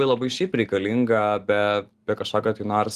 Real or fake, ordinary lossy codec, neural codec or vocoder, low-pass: real; Opus, 24 kbps; none; 14.4 kHz